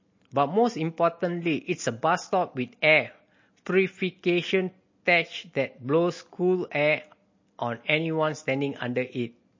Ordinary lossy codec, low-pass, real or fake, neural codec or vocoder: MP3, 32 kbps; 7.2 kHz; real; none